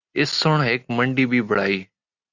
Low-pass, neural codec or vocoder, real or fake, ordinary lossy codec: 7.2 kHz; none; real; Opus, 64 kbps